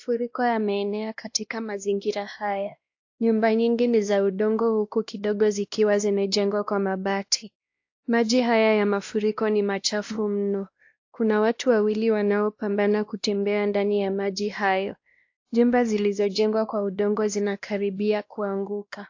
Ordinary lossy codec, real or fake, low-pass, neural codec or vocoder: AAC, 48 kbps; fake; 7.2 kHz; codec, 16 kHz, 1 kbps, X-Codec, WavLM features, trained on Multilingual LibriSpeech